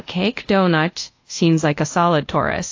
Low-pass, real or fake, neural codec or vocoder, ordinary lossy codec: 7.2 kHz; fake; codec, 24 kHz, 0.5 kbps, DualCodec; AAC, 48 kbps